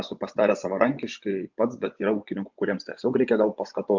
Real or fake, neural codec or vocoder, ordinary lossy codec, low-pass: fake; vocoder, 24 kHz, 100 mel bands, Vocos; MP3, 64 kbps; 7.2 kHz